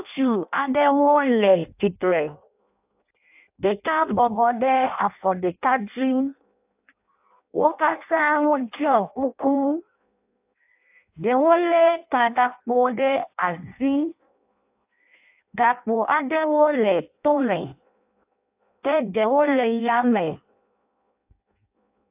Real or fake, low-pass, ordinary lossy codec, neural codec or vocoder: fake; 3.6 kHz; AAC, 32 kbps; codec, 16 kHz in and 24 kHz out, 0.6 kbps, FireRedTTS-2 codec